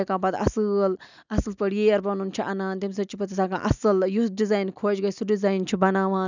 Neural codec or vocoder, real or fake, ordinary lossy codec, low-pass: none; real; none; 7.2 kHz